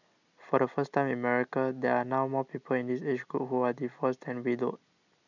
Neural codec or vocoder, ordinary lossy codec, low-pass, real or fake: none; none; 7.2 kHz; real